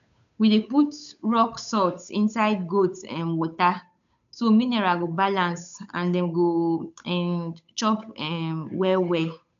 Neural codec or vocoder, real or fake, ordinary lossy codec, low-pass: codec, 16 kHz, 8 kbps, FunCodec, trained on Chinese and English, 25 frames a second; fake; none; 7.2 kHz